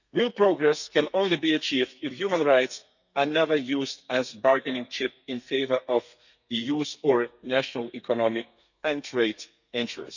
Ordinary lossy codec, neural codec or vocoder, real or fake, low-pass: none; codec, 32 kHz, 1.9 kbps, SNAC; fake; 7.2 kHz